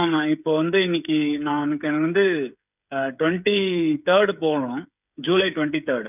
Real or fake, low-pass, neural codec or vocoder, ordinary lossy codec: fake; 3.6 kHz; codec, 16 kHz, 8 kbps, FreqCodec, smaller model; none